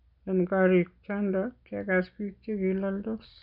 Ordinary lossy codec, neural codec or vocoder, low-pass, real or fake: none; none; 5.4 kHz; real